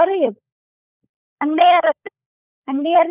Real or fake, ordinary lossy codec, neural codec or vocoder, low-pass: fake; none; codec, 16 kHz, 16 kbps, FunCodec, trained on LibriTTS, 50 frames a second; 3.6 kHz